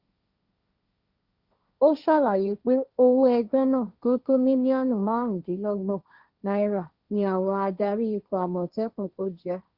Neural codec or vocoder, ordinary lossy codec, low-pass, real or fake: codec, 16 kHz, 1.1 kbps, Voila-Tokenizer; Opus, 64 kbps; 5.4 kHz; fake